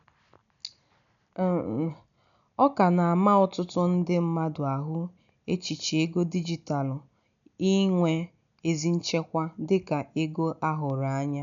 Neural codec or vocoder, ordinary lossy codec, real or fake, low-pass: none; none; real; 7.2 kHz